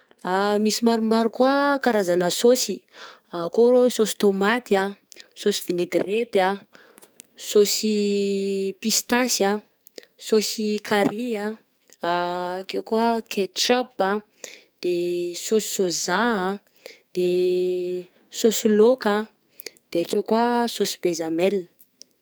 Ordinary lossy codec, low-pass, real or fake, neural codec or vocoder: none; none; fake; codec, 44.1 kHz, 2.6 kbps, SNAC